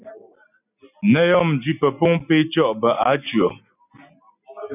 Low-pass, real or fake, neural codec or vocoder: 3.6 kHz; real; none